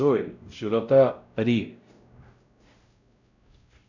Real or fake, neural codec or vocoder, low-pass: fake; codec, 16 kHz, 0.5 kbps, X-Codec, WavLM features, trained on Multilingual LibriSpeech; 7.2 kHz